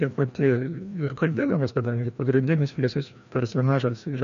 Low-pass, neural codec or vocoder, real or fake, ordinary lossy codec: 7.2 kHz; codec, 16 kHz, 1 kbps, FreqCodec, larger model; fake; MP3, 48 kbps